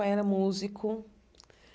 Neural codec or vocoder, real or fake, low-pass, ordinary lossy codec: none; real; none; none